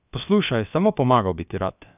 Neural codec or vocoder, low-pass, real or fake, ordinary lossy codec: codec, 16 kHz, about 1 kbps, DyCAST, with the encoder's durations; 3.6 kHz; fake; none